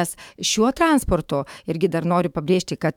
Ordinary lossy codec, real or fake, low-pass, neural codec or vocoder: MP3, 96 kbps; fake; 19.8 kHz; autoencoder, 48 kHz, 128 numbers a frame, DAC-VAE, trained on Japanese speech